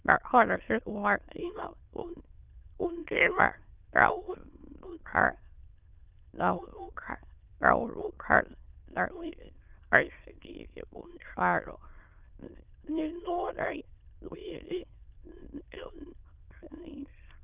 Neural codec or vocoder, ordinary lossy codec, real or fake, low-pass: autoencoder, 22.05 kHz, a latent of 192 numbers a frame, VITS, trained on many speakers; Opus, 24 kbps; fake; 3.6 kHz